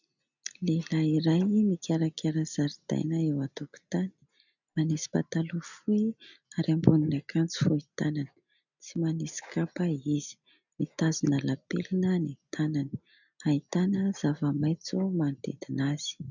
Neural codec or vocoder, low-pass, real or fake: none; 7.2 kHz; real